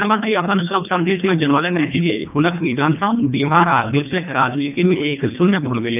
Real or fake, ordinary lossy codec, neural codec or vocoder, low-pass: fake; none; codec, 24 kHz, 1.5 kbps, HILCodec; 3.6 kHz